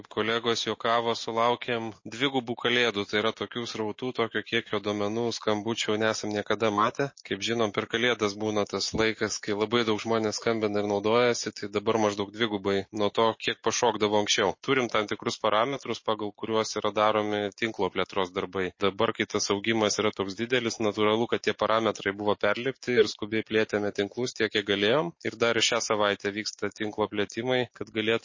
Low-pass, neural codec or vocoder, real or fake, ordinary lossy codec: 7.2 kHz; none; real; MP3, 32 kbps